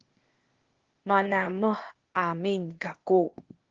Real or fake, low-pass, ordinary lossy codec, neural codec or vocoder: fake; 7.2 kHz; Opus, 16 kbps; codec, 16 kHz, 0.8 kbps, ZipCodec